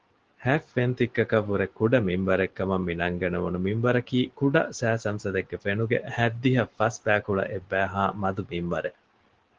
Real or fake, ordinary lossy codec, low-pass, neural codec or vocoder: real; Opus, 16 kbps; 7.2 kHz; none